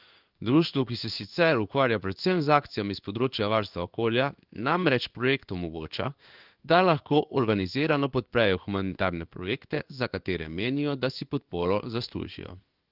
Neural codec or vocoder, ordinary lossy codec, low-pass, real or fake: codec, 16 kHz in and 24 kHz out, 1 kbps, XY-Tokenizer; Opus, 24 kbps; 5.4 kHz; fake